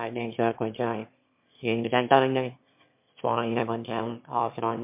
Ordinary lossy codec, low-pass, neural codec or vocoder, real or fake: MP3, 32 kbps; 3.6 kHz; autoencoder, 22.05 kHz, a latent of 192 numbers a frame, VITS, trained on one speaker; fake